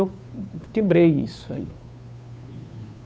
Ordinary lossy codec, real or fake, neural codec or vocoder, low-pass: none; fake; codec, 16 kHz, 2 kbps, FunCodec, trained on Chinese and English, 25 frames a second; none